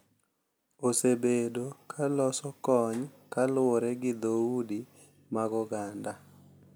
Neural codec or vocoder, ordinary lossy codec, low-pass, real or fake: none; none; none; real